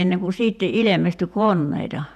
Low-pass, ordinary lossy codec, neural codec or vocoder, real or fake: 14.4 kHz; none; vocoder, 48 kHz, 128 mel bands, Vocos; fake